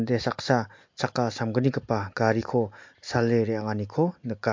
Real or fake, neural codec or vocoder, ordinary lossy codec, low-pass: real; none; MP3, 48 kbps; 7.2 kHz